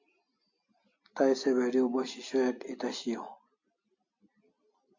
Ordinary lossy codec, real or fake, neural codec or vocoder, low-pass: MP3, 48 kbps; real; none; 7.2 kHz